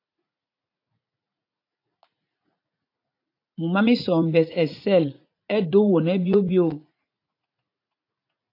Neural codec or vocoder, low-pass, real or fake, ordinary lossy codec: vocoder, 44.1 kHz, 80 mel bands, Vocos; 5.4 kHz; fake; AAC, 32 kbps